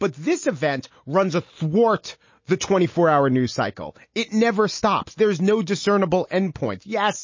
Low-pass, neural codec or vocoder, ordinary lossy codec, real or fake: 7.2 kHz; none; MP3, 32 kbps; real